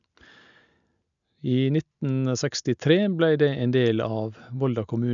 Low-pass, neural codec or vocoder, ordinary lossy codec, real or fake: 7.2 kHz; none; none; real